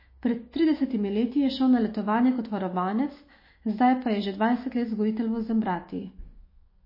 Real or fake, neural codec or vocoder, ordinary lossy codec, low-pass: fake; autoencoder, 48 kHz, 128 numbers a frame, DAC-VAE, trained on Japanese speech; MP3, 24 kbps; 5.4 kHz